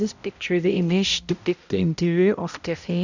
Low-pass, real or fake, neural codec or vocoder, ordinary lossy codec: 7.2 kHz; fake; codec, 16 kHz, 0.5 kbps, X-Codec, HuBERT features, trained on balanced general audio; none